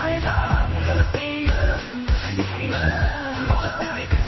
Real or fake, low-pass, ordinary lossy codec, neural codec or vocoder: fake; 7.2 kHz; MP3, 24 kbps; codec, 16 kHz in and 24 kHz out, 0.9 kbps, LongCat-Audio-Codec, fine tuned four codebook decoder